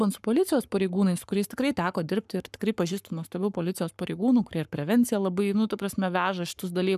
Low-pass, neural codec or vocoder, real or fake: 14.4 kHz; codec, 44.1 kHz, 7.8 kbps, Pupu-Codec; fake